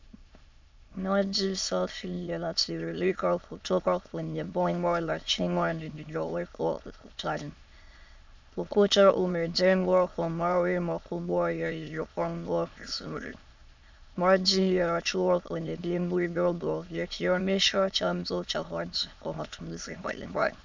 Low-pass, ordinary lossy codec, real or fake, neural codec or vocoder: 7.2 kHz; MP3, 64 kbps; fake; autoencoder, 22.05 kHz, a latent of 192 numbers a frame, VITS, trained on many speakers